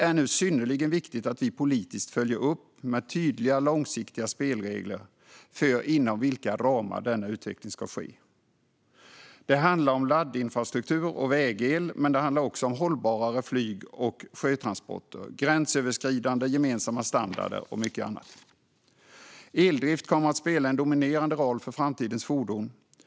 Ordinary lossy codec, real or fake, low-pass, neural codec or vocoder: none; real; none; none